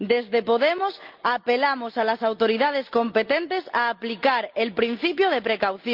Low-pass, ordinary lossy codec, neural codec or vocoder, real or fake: 5.4 kHz; Opus, 24 kbps; none; real